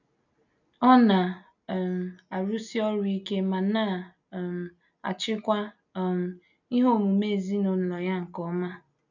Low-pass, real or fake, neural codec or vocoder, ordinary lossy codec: 7.2 kHz; real; none; none